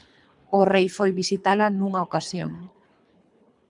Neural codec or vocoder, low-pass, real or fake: codec, 24 kHz, 3 kbps, HILCodec; 10.8 kHz; fake